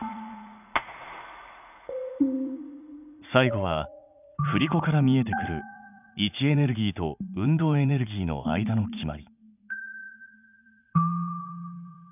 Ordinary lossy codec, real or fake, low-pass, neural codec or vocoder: none; fake; 3.6 kHz; vocoder, 44.1 kHz, 80 mel bands, Vocos